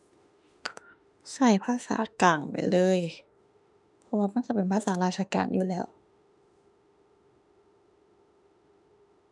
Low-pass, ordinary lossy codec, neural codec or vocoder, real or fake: 10.8 kHz; none; autoencoder, 48 kHz, 32 numbers a frame, DAC-VAE, trained on Japanese speech; fake